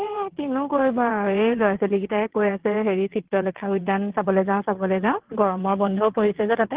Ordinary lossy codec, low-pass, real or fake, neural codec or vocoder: Opus, 16 kbps; 3.6 kHz; fake; vocoder, 22.05 kHz, 80 mel bands, WaveNeXt